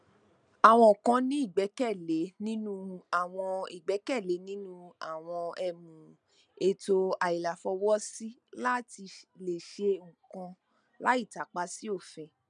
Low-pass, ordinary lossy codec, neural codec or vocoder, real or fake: 9.9 kHz; none; none; real